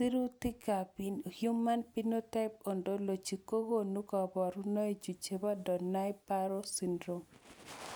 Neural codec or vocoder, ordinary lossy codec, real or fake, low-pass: none; none; real; none